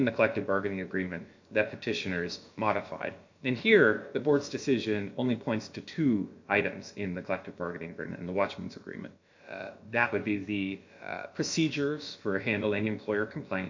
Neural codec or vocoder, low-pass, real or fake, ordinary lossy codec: codec, 16 kHz, about 1 kbps, DyCAST, with the encoder's durations; 7.2 kHz; fake; MP3, 48 kbps